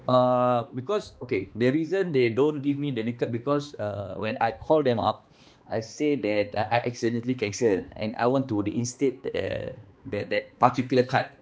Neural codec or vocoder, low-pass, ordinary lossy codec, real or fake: codec, 16 kHz, 2 kbps, X-Codec, HuBERT features, trained on balanced general audio; none; none; fake